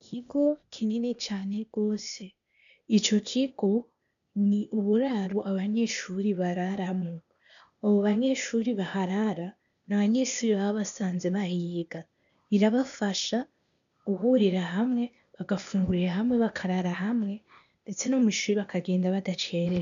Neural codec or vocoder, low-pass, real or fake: codec, 16 kHz, 0.8 kbps, ZipCodec; 7.2 kHz; fake